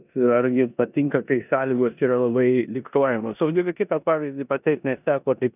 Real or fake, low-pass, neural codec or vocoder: fake; 3.6 kHz; codec, 16 kHz in and 24 kHz out, 0.9 kbps, LongCat-Audio-Codec, four codebook decoder